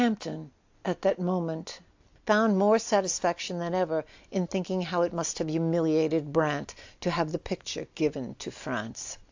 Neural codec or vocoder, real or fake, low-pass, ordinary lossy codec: none; real; 7.2 kHz; AAC, 48 kbps